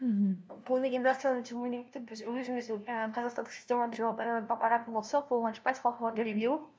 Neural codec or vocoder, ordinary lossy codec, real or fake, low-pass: codec, 16 kHz, 0.5 kbps, FunCodec, trained on LibriTTS, 25 frames a second; none; fake; none